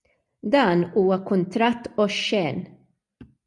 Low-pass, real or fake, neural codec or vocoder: 10.8 kHz; real; none